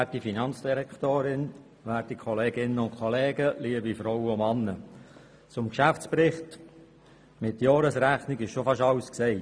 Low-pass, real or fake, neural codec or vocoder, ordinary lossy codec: none; real; none; none